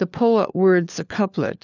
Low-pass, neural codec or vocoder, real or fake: 7.2 kHz; codec, 16 kHz, 4 kbps, FunCodec, trained on LibriTTS, 50 frames a second; fake